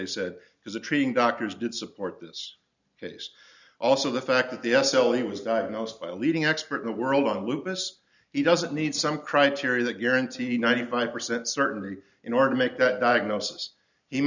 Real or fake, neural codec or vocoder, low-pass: real; none; 7.2 kHz